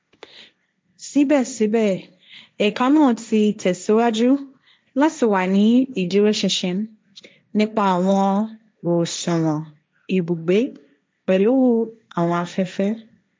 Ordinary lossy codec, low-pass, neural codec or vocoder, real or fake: none; none; codec, 16 kHz, 1.1 kbps, Voila-Tokenizer; fake